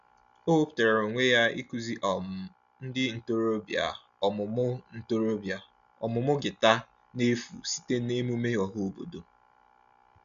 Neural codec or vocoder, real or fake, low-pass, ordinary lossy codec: none; real; 7.2 kHz; MP3, 96 kbps